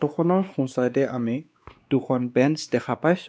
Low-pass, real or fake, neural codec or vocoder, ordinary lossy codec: none; fake; codec, 16 kHz, 2 kbps, X-Codec, WavLM features, trained on Multilingual LibriSpeech; none